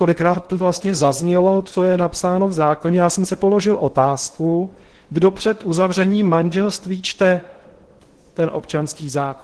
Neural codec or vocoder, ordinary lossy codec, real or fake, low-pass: codec, 16 kHz in and 24 kHz out, 0.8 kbps, FocalCodec, streaming, 65536 codes; Opus, 16 kbps; fake; 10.8 kHz